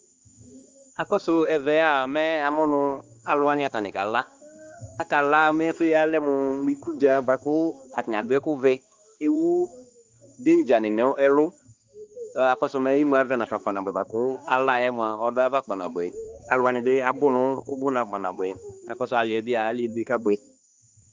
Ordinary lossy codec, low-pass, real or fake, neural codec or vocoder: Opus, 32 kbps; 7.2 kHz; fake; codec, 16 kHz, 2 kbps, X-Codec, HuBERT features, trained on balanced general audio